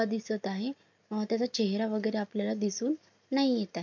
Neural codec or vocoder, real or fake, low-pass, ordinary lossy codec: none; real; 7.2 kHz; MP3, 64 kbps